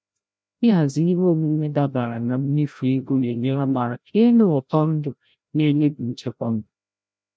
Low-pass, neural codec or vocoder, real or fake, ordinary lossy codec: none; codec, 16 kHz, 0.5 kbps, FreqCodec, larger model; fake; none